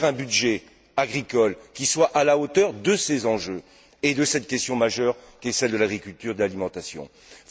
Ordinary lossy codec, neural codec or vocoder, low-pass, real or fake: none; none; none; real